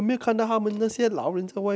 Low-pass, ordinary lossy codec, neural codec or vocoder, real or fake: none; none; none; real